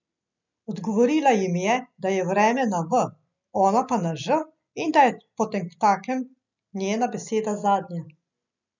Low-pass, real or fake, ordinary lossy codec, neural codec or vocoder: 7.2 kHz; real; none; none